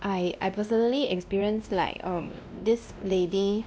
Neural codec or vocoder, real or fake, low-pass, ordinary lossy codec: codec, 16 kHz, 1 kbps, X-Codec, WavLM features, trained on Multilingual LibriSpeech; fake; none; none